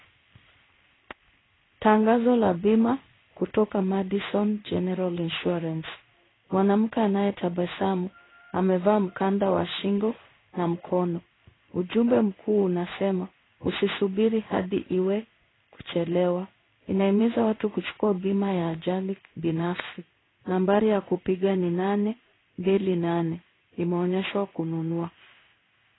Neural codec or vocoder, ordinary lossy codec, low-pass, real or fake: codec, 16 kHz in and 24 kHz out, 1 kbps, XY-Tokenizer; AAC, 16 kbps; 7.2 kHz; fake